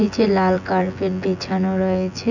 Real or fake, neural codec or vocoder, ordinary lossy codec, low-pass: fake; vocoder, 24 kHz, 100 mel bands, Vocos; none; 7.2 kHz